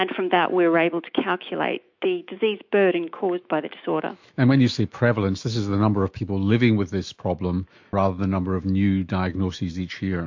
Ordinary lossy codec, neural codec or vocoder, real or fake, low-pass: MP3, 48 kbps; autoencoder, 48 kHz, 128 numbers a frame, DAC-VAE, trained on Japanese speech; fake; 7.2 kHz